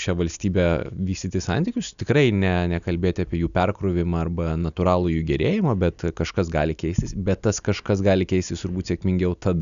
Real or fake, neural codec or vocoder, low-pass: real; none; 7.2 kHz